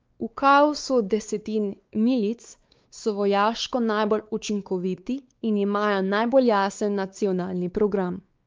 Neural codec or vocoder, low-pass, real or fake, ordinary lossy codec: codec, 16 kHz, 4 kbps, X-Codec, WavLM features, trained on Multilingual LibriSpeech; 7.2 kHz; fake; Opus, 24 kbps